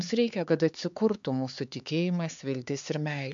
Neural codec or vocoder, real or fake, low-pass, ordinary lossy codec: codec, 16 kHz, 4 kbps, X-Codec, HuBERT features, trained on LibriSpeech; fake; 7.2 kHz; MP3, 64 kbps